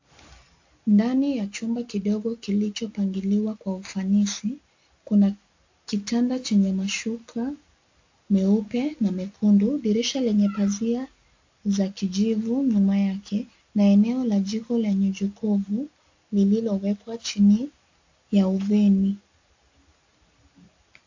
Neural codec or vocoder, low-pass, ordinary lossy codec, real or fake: none; 7.2 kHz; AAC, 48 kbps; real